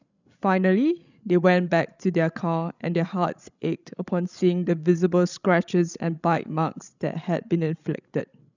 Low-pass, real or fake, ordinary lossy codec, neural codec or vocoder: 7.2 kHz; fake; none; codec, 16 kHz, 16 kbps, FreqCodec, larger model